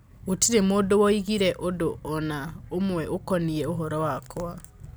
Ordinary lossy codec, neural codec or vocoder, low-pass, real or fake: none; none; none; real